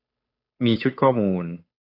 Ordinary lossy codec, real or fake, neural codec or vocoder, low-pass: AAC, 32 kbps; fake; codec, 16 kHz, 8 kbps, FunCodec, trained on Chinese and English, 25 frames a second; 5.4 kHz